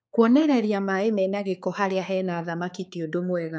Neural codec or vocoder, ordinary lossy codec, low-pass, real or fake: codec, 16 kHz, 4 kbps, X-Codec, HuBERT features, trained on balanced general audio; none; none; fake